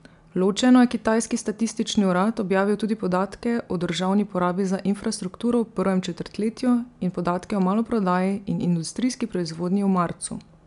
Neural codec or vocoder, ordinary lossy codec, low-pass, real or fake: none; none; 10.8 kHz; real